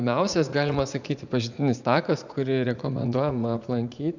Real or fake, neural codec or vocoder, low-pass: fake; vocoder, 44.1 kHz, 80 mel bands, Vocos; 7.2 kHz